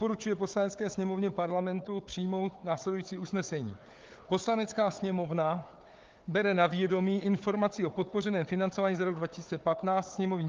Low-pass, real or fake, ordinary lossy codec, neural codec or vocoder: 7.2 kHz; fake; Opus, 32 kbps; codec, 16 kHz, 4 kbps, FunCodec, trained on Chinese and English, 50 frames a second